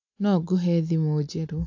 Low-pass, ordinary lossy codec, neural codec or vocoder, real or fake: 7.2 kHz; AAC, 48 kbps; none; real